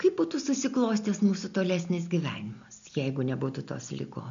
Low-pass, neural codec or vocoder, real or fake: 7.2 kHz; none; real